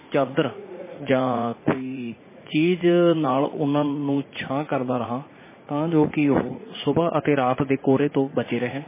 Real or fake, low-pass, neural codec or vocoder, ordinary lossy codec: fake; 3.6 kHz; vocoder, 44.1 kHz, 80 mel bands, Vocos; MP3, 16 kbps